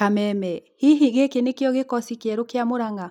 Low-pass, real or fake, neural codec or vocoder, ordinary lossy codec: 19.8 kHz; real; none; none